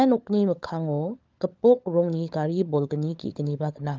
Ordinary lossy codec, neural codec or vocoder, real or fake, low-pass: Opus, 32 kbps; codec, 16 kHz, 16 kbps, FunCodec, trained on LibriTTS, 50 frames a second; fake; 7.2 kHz